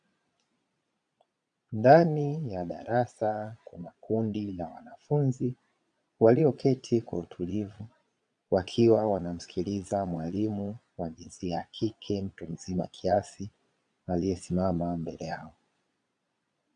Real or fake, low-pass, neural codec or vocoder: fake; 9.9 kHz; vocoder, 22.05 kHz, 80 mel bands, Vocos